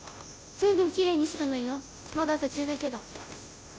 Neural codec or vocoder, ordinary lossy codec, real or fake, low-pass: codec, 16 kHz, 0.5 kbps, FunCodec, trained on Chinese and English, 25 frames a second; none; fake; none